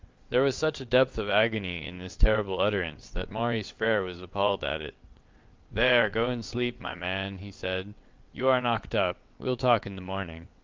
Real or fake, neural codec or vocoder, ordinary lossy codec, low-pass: fake; vocoder, 22.05 kHz, 80 mel bands, WaveNeXt; Opus, 32 kbps; 7.2 kHz